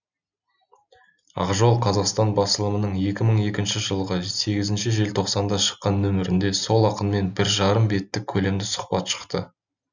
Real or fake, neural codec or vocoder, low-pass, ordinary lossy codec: real; none; 7.2 kHz; none